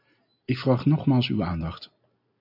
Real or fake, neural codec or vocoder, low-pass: real; none; 5.4 kHz